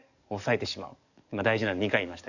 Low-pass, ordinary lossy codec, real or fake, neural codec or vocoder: 7.2 kHz; none; fake; codec, 44.1 kHz, 7.8 kbps, DAC